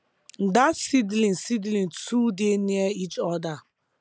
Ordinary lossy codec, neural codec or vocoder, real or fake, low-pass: none; none; real; none